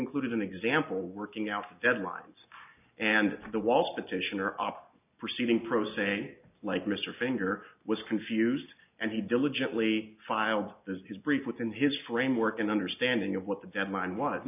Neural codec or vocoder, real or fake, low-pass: none; real; 3.6 kHz